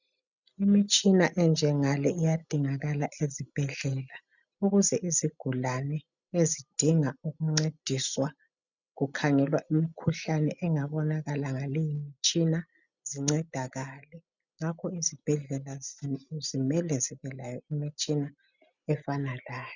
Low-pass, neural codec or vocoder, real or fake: 7.2 kHz; none; real